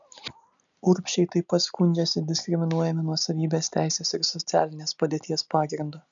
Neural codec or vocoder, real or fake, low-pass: none; real; 7.2 kHz